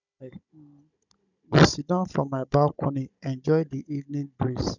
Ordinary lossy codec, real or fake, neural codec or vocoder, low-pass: none; fake; codec, 16 kHz, 16 kbps, FunCodec, trained on Chinese and English, 50 frames a second; 7.2 kHz